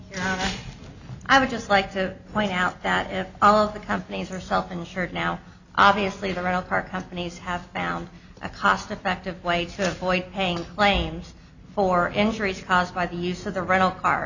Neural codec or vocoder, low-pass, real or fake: none; 7.2 kHz; real